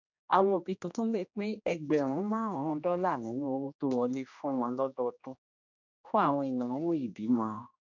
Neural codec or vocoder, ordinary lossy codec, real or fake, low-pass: codec, 16 kHz, 1 kbps, X-Codec, HuBERT features, trained on general audio; none; fake; 7.2 kHz